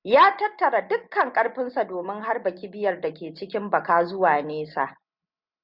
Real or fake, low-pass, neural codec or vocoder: real; 5.4 kHz; none